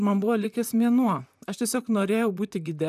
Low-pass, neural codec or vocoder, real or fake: 14.4 kHz; vocoder, 44.1 kHz, 128 mel bands, Pupu-Vocoder; fake